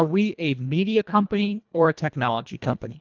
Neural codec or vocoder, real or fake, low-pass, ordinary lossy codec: codec, 24 kHz, 1.5 kbps, HILCodec; fake; 7.2 kHz; Opus, 24 kbps